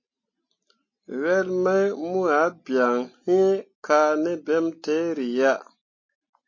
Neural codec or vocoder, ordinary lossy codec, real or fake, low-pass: none; MP3, 32 kbps; real; 7.2 kHz